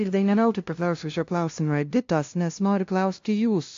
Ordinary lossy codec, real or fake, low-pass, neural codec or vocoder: AAC, 48 kbps; fake; 7.2 kHz; codec, 16 kHz, 0.5 kbps, FunCodec, trained on LibriTTS, 25 frames a second